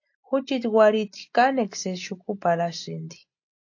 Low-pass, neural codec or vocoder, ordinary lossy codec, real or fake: 7.2 kHz; none; AAC, 48 kbps; real